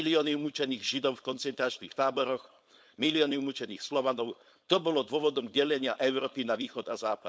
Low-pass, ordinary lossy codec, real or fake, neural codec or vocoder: none; none; fake; codec, 16 kHz, 4.8 kbps, FACodec